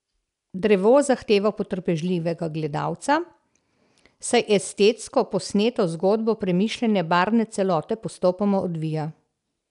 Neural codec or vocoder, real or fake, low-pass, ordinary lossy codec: none; real; 10.8 kHz; none